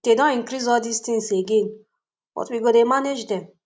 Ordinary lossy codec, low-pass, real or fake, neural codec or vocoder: none; none; real; none